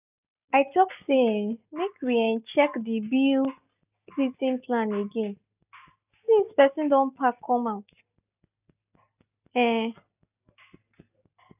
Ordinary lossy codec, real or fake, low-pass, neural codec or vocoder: none; real; 3.6 kHz; none